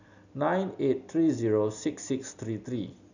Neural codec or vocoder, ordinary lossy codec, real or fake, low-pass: none; none; real; 7.2 kHz